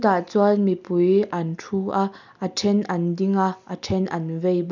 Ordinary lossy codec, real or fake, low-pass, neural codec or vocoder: none; real; 7.2 kHz; none